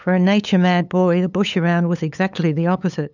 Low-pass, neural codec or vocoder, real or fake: 7.2 kHz; codec, 16 kHz, 8 kbps, FunCodec, trained on LibriTTS, 25 frames a second; fake